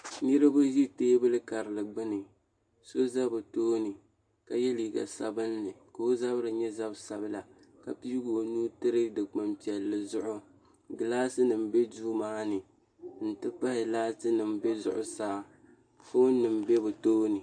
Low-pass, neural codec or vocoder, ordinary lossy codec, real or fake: 9.9 kHz; none; AAC, 64 kbps; real